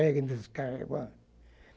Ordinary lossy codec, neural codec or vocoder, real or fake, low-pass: none; none; real; none